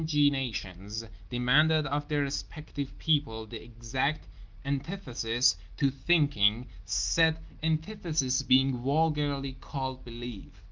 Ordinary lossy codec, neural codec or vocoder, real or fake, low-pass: Opus, 32 kbps; none; real; 7.2 kHz